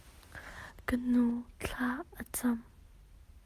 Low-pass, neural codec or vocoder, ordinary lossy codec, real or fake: 14.4 kHz; none; Opus, 32 kbps; real